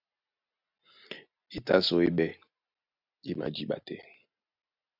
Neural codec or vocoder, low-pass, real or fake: none; 5.4 kHz; real